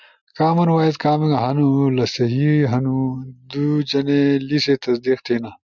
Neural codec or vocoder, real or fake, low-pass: none; real; 7.2 kHz